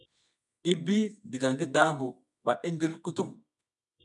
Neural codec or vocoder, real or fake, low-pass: codec, 24 kHz, 0.9 kbps, WavTokenizer, medium music audio release; fake; 10.8 kHz